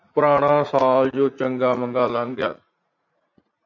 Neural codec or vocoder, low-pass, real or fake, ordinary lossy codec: vocoder, 44.1 kHz, 80 mel bands, Vocos; 7.2 kHz; fake; MP3, 48 kbps